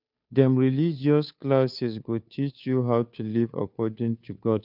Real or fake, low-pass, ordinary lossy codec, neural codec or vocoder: fake; 5.4 kHz; none; codec, 16 kHz, 2 kbps, FunCodec, trained on Chinese and English, 25 frames a second